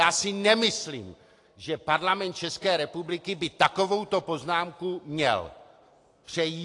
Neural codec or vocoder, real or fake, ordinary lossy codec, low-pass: none; real; AAC, 48 kbps; 10.8 kHz